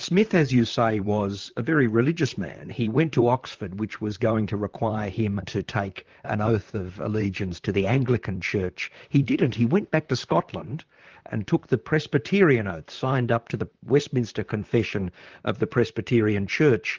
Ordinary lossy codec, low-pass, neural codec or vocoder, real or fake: Opus, 32 kbps; 7.2 kHz; vocoder, 44.1 kHz, 128 mel bands, Pupu-Vocoder; fake